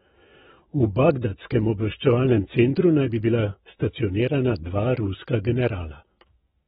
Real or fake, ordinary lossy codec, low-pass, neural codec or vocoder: fake; AAC, 16 kbps; 19.8 kHz; autoencoder, 48 kHz, 128 numbers a frame, DAC-VAE, trained on Japanese speech